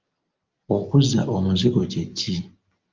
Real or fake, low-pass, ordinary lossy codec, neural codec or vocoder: real; 7.2 kHz; Opus, 24 kbps; none